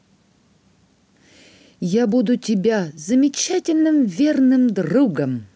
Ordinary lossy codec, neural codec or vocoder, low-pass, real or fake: none; none; none; real